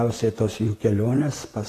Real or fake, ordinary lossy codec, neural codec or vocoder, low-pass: fake; AAC, 48 kbps; vocoder, 44.1 kHz, 128 mel bands, Pupu-Vocoder; 14.4 kHz